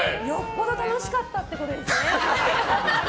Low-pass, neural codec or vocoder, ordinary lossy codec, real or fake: none; none; none; real